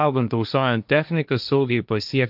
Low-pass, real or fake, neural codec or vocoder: 5.4 kHz; fake; codec, 16 kHz, 1.1 kbps, Voila-Tokenizer